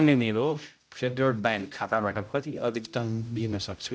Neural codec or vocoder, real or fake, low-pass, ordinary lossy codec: codec, 16 kHz, 0.5 kbps, X-Codec, HuBERT features, trained on general audio; fake; none; none